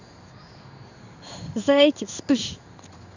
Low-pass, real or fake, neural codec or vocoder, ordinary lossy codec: 7.2 kHz; fake; codec, 16 kHz in and 24 kHz out, 1 kbps, XY-Tokenizer; none